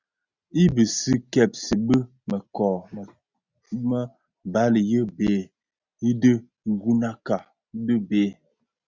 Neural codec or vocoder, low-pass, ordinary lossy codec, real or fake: none; 7.2 kHz; Opus, 64 kbps; real